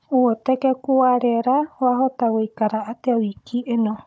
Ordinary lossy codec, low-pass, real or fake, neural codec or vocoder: none; none; fake; codec, 16 kHz, 16 kbps, FunCodec, trained on Chinese and English, 50 frames a second